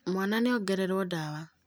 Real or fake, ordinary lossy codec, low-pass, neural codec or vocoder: real; none; none; none